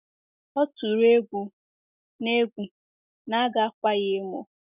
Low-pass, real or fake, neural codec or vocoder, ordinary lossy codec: 3.6 kHz; real; none; none